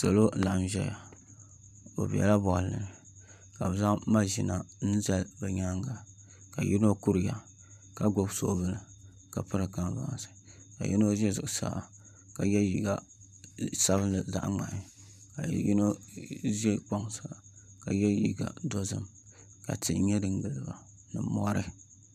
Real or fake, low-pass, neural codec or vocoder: fake; 14.4 kHz; vocoder, 44.1 kHz, 128 mel bands every 512 samples, BigVGAN v2